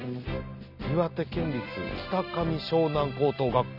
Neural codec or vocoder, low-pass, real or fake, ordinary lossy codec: none; 5.4 kHz; real; none